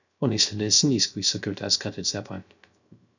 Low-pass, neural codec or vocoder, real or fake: 7.2 kHz; codec, 16 kHz, 0.3 kbps, FocalCodec; fake